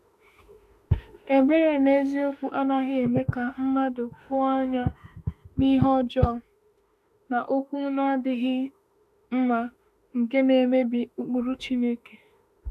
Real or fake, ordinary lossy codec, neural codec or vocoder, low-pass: fake; none; autoencoder, 48 kHz, 32 numbers a frame, DAC-VAE, trained on Japanese speech; 14.4 kHz